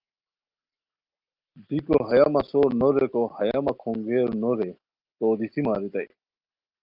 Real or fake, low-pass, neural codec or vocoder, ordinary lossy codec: real; 5.4 kHz; none; Opus, 24 kbps